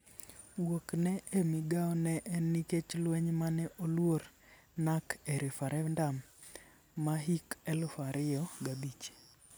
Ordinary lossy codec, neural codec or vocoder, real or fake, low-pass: none; none; real; none